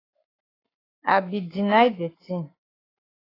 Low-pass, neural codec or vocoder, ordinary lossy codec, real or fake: 5.4 kHz; codec, 44.1 kHz, 7.8 kbps, Pupu-Codec; AAC, 24 kbps; fake